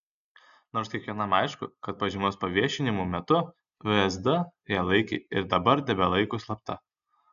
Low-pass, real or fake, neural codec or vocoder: 7.2 kHz; real; none